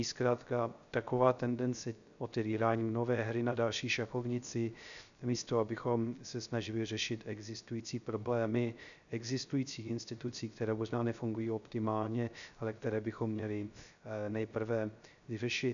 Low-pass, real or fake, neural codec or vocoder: 7.2 kHz; fake; codec, 16 kHz, 0.3 kbps, FocalCodec